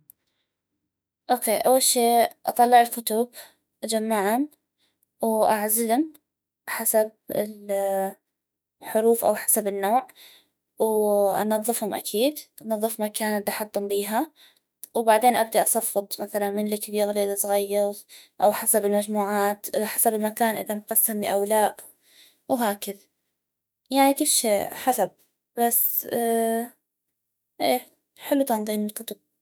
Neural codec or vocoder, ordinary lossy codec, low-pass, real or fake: autoencoder, 48 kHz, 32 numbers a frame, DAC-VAE, trained on Japanese speech; none; none; fake